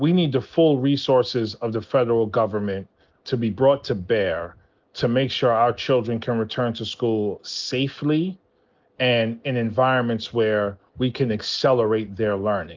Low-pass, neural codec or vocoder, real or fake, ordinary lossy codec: 7.2 kHz; none; real; Opus, 32 kbps